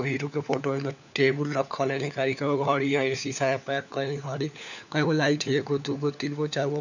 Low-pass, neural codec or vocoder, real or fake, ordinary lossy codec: 7.2 kHz; codec, 16 kHz, 4 kbps, FunCodec, trained on LibriTTS, 50 frames a second; fake; none